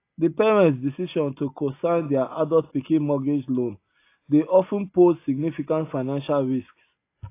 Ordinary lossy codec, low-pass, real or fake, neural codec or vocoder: AAC, 24 kbps; 3.6 kHz; real; none